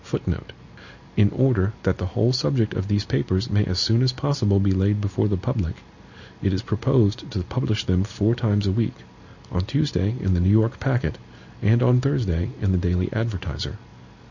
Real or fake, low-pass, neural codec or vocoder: real; 7.2 kHz; none